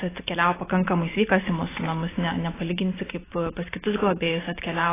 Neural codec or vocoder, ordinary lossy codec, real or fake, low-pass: none; AAC, 16 kbps; real; 3.6 kHz